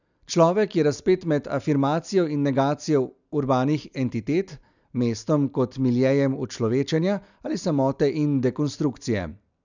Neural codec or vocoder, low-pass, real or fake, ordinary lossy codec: none; 7.2 kHz; real; none